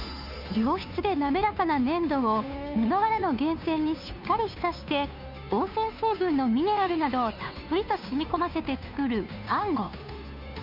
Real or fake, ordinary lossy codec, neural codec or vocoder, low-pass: fake; none; codec, 16 kHz, 2 kbps, FunCodec, trained on Chinese and English, 25 frames a second; 5.4 kHz